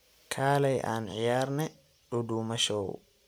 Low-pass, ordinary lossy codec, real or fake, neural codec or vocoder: none; none; real; none